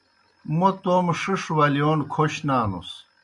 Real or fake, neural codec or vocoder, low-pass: real; none; 10.8 kHz